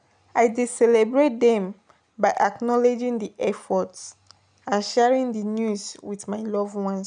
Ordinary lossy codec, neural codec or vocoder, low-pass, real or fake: none; none; 9.9 kHz; real